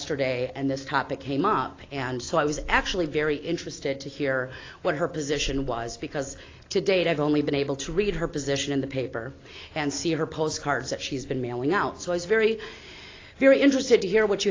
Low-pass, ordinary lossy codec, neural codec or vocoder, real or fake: 7.2 kHz; AAC, 32 kbps; none; real